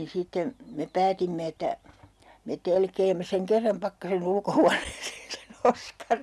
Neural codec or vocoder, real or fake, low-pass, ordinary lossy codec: none; real; none; none